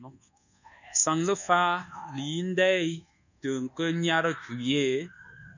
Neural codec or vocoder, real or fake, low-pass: codec, 24 kHz, 1.2 kbps, DualCodec; fake; 7.2 kHz